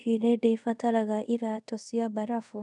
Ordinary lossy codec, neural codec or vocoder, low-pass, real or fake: none; codec, 24 kHz, 0.5 kbps, DualCodec; 10.8 kHz; fake